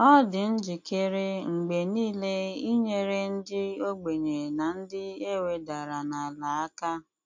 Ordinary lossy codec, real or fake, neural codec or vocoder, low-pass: MP3, 48 kbps; real; none; 7.2 kHz